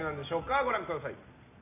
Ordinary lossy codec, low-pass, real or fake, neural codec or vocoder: none; 3.6 kHz; real; none